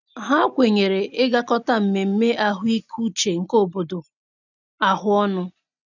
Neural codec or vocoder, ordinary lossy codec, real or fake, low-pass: none; none; real; 7.2 kHz